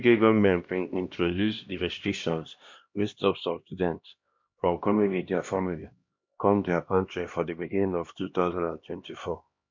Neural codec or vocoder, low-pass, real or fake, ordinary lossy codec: codec, 16 kHz, 1 kbps, X-Codec, HuBERT features, trained on LibriSpeech; 7.2 kHz; fake; MP3, 48 kbps